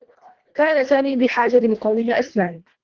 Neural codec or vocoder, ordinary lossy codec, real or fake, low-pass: codec, 24 kHz, 1.5 kbps, HILCodec; Opus, 16 kbps; fake; 7.2 kHz